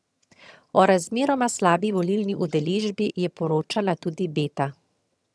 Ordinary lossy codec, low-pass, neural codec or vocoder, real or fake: none; none; vocoder, 22.05 kHz, 80 mel bands, HiFi-GAN; fake